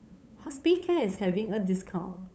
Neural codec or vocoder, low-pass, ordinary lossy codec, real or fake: codec, 16 kHz, 8 kbps, FunCodec, trained on LibriTTS, 25 frames a second; none; none; fake